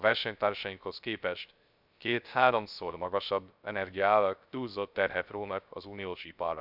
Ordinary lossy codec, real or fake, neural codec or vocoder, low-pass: none; fake; codec, 16 kHz, 0.3 kbps, FocalCodec; 5.4 kHz